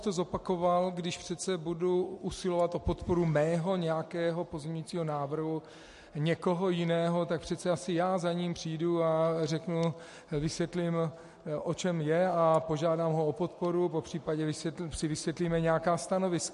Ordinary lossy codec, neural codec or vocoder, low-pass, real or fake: MP3, 48 kbps; none; 14.4 kHz; real